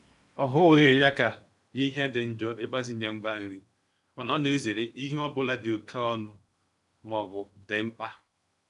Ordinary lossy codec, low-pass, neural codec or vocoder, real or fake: none; 10.8 kHz; codec, 16 kHz in and 24 kHz out, 0.8 kbps, FocalCodec, streaming, 65536 codes; fake